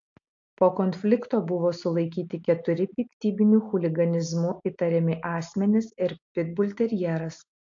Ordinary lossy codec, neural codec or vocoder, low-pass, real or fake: AAC, 48 kbps; none; 7.2 kHz; real